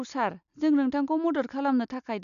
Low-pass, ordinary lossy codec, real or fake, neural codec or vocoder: 7.2 kHz; none; real; none